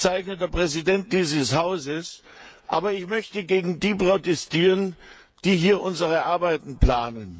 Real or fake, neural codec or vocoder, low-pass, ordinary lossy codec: fake; codec, 16 kHz, 8 kbps, FreqCodec, smaller model; none; none